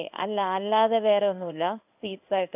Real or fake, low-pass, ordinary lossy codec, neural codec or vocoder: fake; 3.6 kHz; none; codec, 16 kHz in and 24 kHz out, 1 kbps, XY-Tokenizer